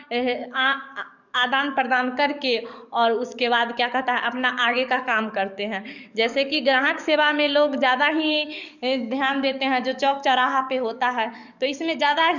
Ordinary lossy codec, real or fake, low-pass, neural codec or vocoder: none; fake; 7.2 kHz; codec, 44.1 kHz, 7.8 kbps, DAC